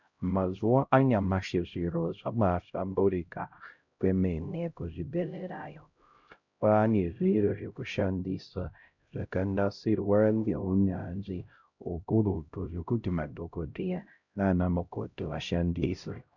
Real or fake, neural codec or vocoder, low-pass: fake; codec, 16 kHz, 0.5 kbps, X-Codec, HuBERT features, trained on LibriSpeech; 7.2 kHz